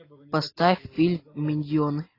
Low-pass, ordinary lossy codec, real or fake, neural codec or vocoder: 5.4 kHz; AAC, 24 kbps; real; none